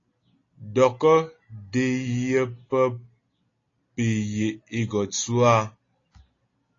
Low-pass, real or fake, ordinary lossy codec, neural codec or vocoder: 7.2 kHz; real; AAC, 32 kbps; none